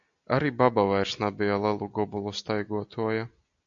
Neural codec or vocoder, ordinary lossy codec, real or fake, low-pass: none; AAC, 64 kbps; real; 7.2 kHz